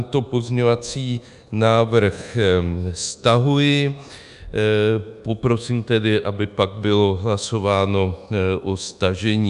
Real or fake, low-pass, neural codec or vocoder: fake; 10.8 kHz; codec, 24 kHz, 1.2 kbps, DualCodec